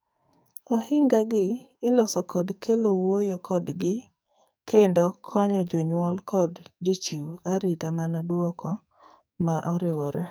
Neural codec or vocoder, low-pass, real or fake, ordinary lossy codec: codec, 44.1 kHz, 2.6 kbps, SNAC; none; fake; none